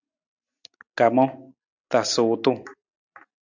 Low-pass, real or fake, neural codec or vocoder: 7.2 kHz; real; none